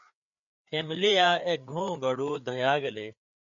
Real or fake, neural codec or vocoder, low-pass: fake; codec, 16 kHz, 4 kbps, FreqCodec, larger model; 7.2 kHz